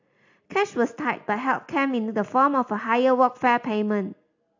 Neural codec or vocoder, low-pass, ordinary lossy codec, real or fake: none; 7.2 kHz; AAC, 48 kbps; real